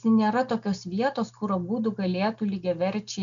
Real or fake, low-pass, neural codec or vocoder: real; 7.2 kHz; none